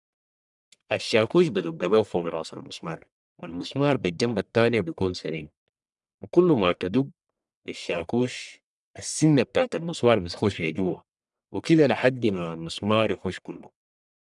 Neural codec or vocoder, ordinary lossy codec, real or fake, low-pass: codec, 44.1 kHz, 1.7 kbps, Pupu-Codec; none; fake; 10.8 kHz